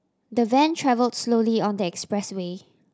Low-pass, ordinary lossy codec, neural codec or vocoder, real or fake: none; none; none; real